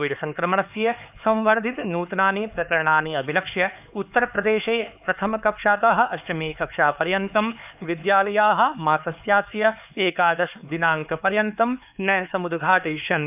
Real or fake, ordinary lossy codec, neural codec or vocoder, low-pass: fake; none; codec, 16 kHz, 4 kbps, X-Codec, HuBERT features, trained on LibriSpeech; 3.6 kHz